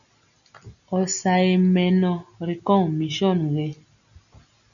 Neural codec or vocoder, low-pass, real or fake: none; 7.2 kHz; real